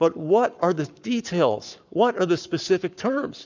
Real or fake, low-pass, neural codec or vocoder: fake; 7.2 kHz; codec, 44.1 kHz, 7.8 kbps, Pupu-Codec